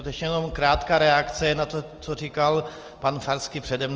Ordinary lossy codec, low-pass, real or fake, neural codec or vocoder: Opus, 24 kbps; 7.2 kHz; real; none